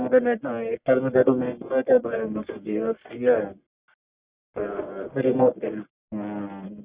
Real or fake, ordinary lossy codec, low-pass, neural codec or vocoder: fake; Opus, 64 kbps; 3.6 kHz; codec, 44.1 kHz, 1.7 kbps, Pupu-Codec